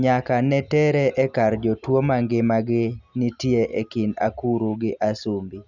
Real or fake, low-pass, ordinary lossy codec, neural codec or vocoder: real; 7.2 kHz; none; none